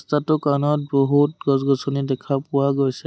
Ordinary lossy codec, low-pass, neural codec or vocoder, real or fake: none; none; none; real